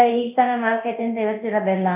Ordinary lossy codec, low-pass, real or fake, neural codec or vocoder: none; 3.6 kHz; fake; codec, 24 kHz, 0.9 kbps, DualCodec